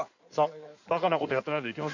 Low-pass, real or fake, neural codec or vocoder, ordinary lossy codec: 7.2 kHz; fake; codec, 16 kHz in and 24 kHz out, 2.2 kbps, FireRedTTS-2 codec; none